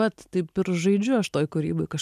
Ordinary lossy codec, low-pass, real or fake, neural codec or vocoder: MP3, 96 kbps; 14.4 kHz; real; none